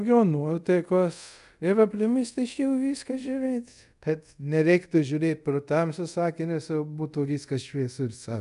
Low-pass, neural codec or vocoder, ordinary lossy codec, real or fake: 10.8 kHz; codec, 24 kHz, 0.5 kbps, DualCodec; MP3, 96 kbps; fake